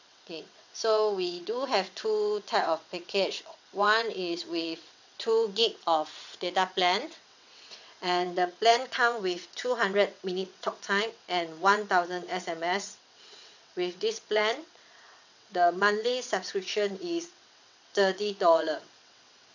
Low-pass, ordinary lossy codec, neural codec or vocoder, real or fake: 7.2 kHz; none; vocoder, 22.05 kHz, 80 mel bands, WaveNeXt; fake